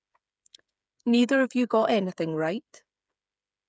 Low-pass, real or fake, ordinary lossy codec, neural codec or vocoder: none; fake; none; codec, 16 kHz, 8 kbps, FreqCodec, smaller model